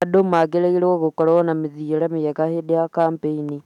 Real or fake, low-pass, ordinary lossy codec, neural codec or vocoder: fake; 19.8 kHz; none; autoencoder, 48 kHz, 128 numbers a frame, DAC-VAE, trained on Japanese speech